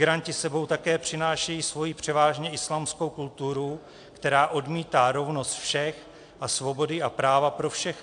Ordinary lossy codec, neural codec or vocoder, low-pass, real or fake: AAC, 64 kbps; none; 9.9 kHz; real